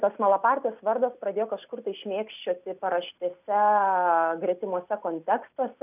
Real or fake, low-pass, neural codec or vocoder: real; 3.6 kHz; none